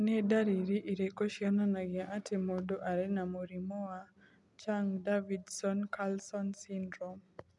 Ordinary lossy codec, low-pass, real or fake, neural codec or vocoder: none; 10.8 kHz; real; none